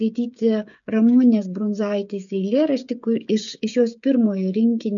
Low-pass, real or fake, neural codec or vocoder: 7.2 kHz; fake; codec, 16 kHz, 16 kbps, FreqCodec, smaller model